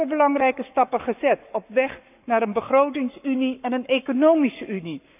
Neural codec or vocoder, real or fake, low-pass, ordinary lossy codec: codec, 44.1 kHz, 7.8 kbps, Pupu-Codec; fake; 3.6 kHz; none